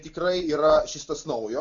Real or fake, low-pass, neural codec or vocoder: real; 7.2 kHz; none